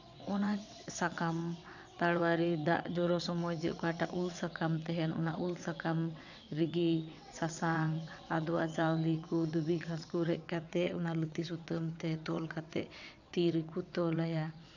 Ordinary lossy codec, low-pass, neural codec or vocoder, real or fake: none; 7.2 kHz; vocoder, 22.05 kHz, 80 mel bands, WaveNeXt; fake